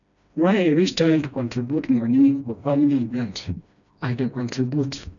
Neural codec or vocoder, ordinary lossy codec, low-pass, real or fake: codec, 16 kHz, 1 kbps, FreqCodec, smaller model; none; 7.2 kHz; fake